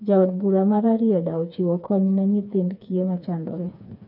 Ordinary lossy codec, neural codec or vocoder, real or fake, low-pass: none; codec, 16 kHz, 4 kbps, FreqCodec, smaller model; fake; 5.4 kHz